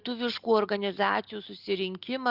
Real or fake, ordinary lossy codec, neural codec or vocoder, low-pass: real; Opus, 64 kbps; none; 5.4 kHz